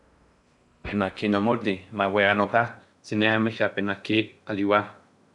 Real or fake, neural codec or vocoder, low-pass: fake; codec, 16 kHz in and 24 kHz out, 0.6 kbps, FocalCodec, streaming, 4096 codes; 10.8 kHz